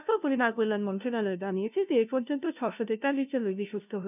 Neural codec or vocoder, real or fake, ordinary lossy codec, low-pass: codec, 16 kHz, 0.5 kbps, FunCodec, trained on LibriTTS, 25 frames a second; fake; none; 3.6 kHz